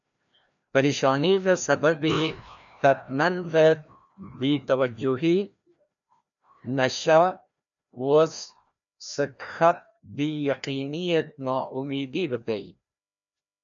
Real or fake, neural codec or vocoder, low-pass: fake; codec, 16 kHz, 1 kbps, FreqCodec, larger model; 7.2 kHz